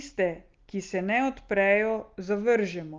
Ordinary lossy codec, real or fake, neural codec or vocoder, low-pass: Opus, 32 kbps; real; none; 7.2 kHz